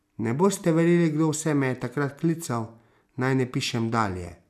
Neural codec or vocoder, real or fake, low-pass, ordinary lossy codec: none; real; 14.4 kHz; none